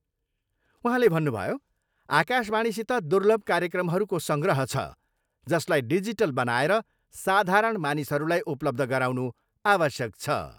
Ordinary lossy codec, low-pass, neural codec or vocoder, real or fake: none; none; none; real